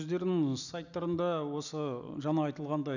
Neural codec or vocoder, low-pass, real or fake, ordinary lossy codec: none; 7.2 kHz; real; none